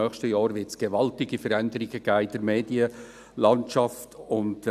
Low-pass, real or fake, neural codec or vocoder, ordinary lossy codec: 14.4 kHz; real; none; none